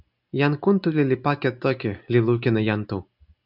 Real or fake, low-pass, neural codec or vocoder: real; 5.4 kHz; none